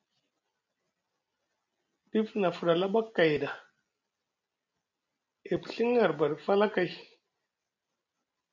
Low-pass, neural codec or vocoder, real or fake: 7.2 kHz; none; real